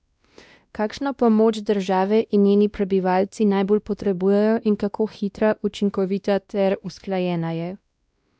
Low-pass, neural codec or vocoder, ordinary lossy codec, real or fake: none; codec, 16 kHz, 1 kbps, X-Codec, WavLM features, trained on Multilingual LibriSpeech; none; fake